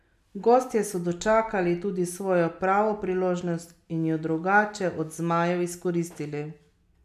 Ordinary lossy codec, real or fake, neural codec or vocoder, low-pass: none; real; none; 14.4 kHz